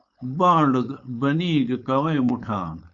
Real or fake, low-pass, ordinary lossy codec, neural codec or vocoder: fake; 7.2 kHz; Opus, 64 kbps; codec, 16 kHz, 4.8 kbps, FACodec